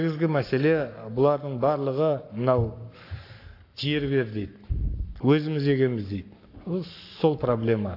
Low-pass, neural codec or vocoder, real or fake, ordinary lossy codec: 5.4 kHz; codec, 44.1 kHz, 7.8 kbps, Pupu-Codec; fake; AAC, 32 kbps